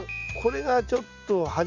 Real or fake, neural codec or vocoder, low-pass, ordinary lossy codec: real; none; 7.2 kHz; none